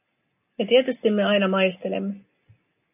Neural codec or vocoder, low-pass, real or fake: none; 3.6 kHz; real